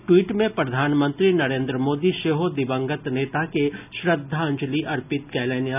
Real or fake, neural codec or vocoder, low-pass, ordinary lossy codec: real; none; 3.6 kHz; none